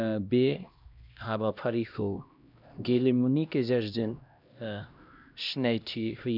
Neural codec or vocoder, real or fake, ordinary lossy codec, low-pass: codec, 16 kHz, 1 kbps, X-Codec, HuBERT features, trained on LibriSpeech; fake; none; 5.4 kHz